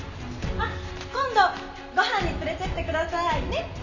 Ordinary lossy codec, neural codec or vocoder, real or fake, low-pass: none; none; real; 7.2 kHz